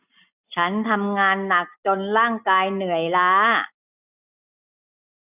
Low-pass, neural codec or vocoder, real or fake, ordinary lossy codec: 3.6 kHz; none; real; none